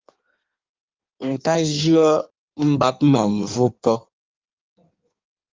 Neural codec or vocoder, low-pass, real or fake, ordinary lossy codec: codec, 16 kHz in and 24 kHz out, 1.1 kbps, FireRedTTS-2 codec; 7.2 kHz; fake; Opus, 32 kbps